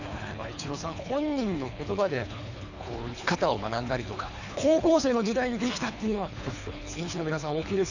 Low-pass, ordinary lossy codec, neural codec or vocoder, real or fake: 7.2 kHz; none; codec, 24 kHz, 3 kbps, HILCodec; fake